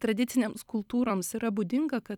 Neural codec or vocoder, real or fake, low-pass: vocoder, 44.1 kHz, 128 mel bands every 256 samples, BigVGAN v2; fake; 19.8 kHz